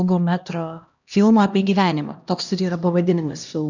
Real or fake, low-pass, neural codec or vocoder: fake; 7.2 kHz; codec, 16 kHz, 1 kbps, X-Codec, HuBERT features, trained on LibriSpeech